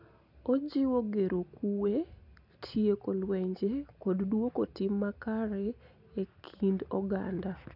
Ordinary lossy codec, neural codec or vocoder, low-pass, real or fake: none; none; 5.4 kHz; real